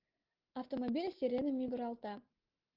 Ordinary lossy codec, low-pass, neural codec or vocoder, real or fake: Opus, 16 kbps; 5.4 kHz; none; real